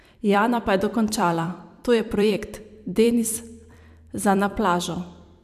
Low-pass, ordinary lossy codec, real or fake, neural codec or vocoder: 14.4 kHz; none; fake; vocoder, 44.1 kHz, 128 mel bands every 256 samples, BigVGAN v2